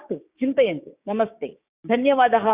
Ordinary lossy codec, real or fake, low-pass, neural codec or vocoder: Opus, 64 kbps; fake; 3.6 kHz; codec, 16 kHz, 6 kbps, DAC